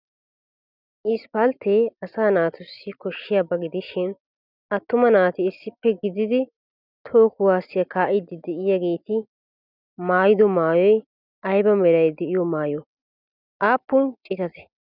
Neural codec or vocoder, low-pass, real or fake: none; 5.4 kHz; real